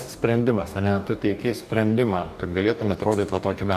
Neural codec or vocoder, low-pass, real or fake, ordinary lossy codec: codec, 44.1 kHz, 2.6 kbps, DAC; 14.4 kHz; fake; AAC, 96 kbps